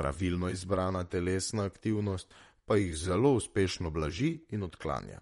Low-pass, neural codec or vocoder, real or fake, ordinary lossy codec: 19.8 kHz; vocoder, 44.1 kHz, 128 mel bands, Pupu-Vocoder; fake; MP3, 48 kbps